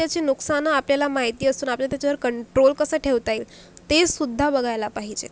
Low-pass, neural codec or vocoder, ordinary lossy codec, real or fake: none; none; none; real